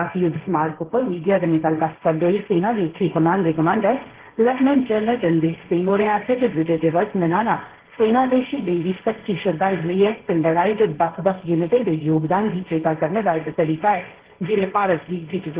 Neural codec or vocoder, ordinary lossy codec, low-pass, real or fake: codec, 16 kHz, 1.1 kbps, Voila-Tokenizer; Opus, 16 kbps; 3.6 kHz; fake